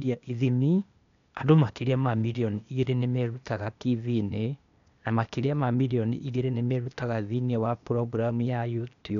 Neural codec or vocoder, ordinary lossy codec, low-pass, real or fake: codec, 16 kHz, 0.8 kbps, ZipCodec; none; 7.2 kHz; fake